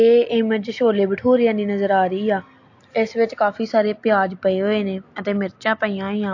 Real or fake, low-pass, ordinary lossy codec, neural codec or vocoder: real; 7.2 kHz; AAC, 48 kbps; none